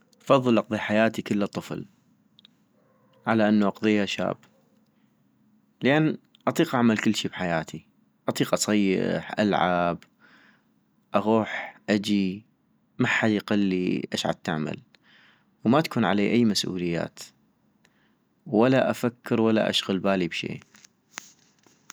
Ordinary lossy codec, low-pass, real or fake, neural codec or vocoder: none; none; real; none